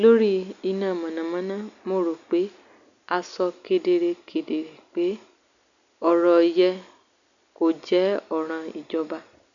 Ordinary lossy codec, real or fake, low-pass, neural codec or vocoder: none; real; 7.2 kHz; none